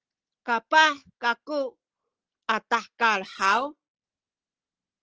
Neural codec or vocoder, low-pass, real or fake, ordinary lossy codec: none; 7.2 kHz; real; Opus, 16 kbps